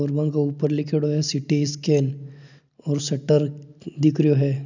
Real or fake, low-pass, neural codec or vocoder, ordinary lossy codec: real; 7.2 kHz; none; none